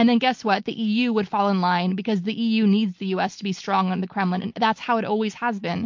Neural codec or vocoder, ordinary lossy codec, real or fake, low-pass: none; MP3, 48 kbps; real; 7.2 kHz